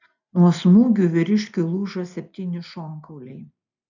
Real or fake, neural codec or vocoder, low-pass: real; none; 7.2 kHz